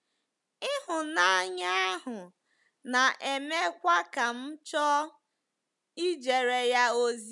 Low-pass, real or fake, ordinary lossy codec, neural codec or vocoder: 10.8 kHz; real; none; none